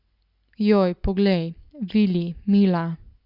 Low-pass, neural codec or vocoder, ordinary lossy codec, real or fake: 5.4 kHz; none; Opus, 64 kbps; real